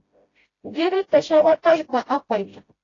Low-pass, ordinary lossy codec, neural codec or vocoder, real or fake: 7.2 kHz; AAC, 32 kbps; codec, 16 kHz, 0.5 kbps, FreqCodec, smaller model; fake